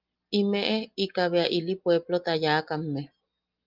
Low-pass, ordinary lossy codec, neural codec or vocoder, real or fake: 5.4 kHz; Opus, 32 kbps; none; real